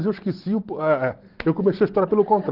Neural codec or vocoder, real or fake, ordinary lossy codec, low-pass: none; real; Opus, 16 kbps; 5.4 kHz